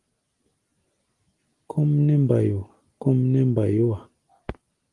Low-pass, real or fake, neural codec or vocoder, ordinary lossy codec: 10.8 kHz; real; none; Opus, 24 kbps